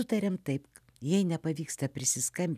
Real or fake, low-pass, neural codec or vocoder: real; 14.4 kHz; none